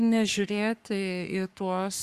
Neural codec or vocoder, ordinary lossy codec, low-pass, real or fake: codec, 44.1 kHz, 3.4 kbps, Pupu-Codec; Opus, 64 kbps; 14.4 kHz; fake